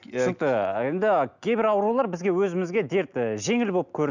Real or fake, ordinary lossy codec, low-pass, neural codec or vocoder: real; none; 7.2 kHz; none